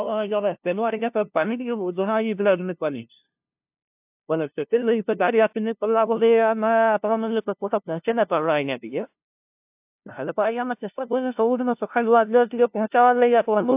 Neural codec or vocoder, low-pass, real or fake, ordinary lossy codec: codec, 16 kHz, 0.5 kbps, FunCodec, trained on LibriTTS, 25 frames a second; 3.6 kHz; fake; AAC, 32 kbps